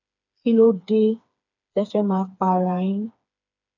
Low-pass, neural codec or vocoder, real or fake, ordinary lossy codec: 7.2 kHz; codec, 16 kHz, 4 kbps, FreqCodec, smaller model; fake; none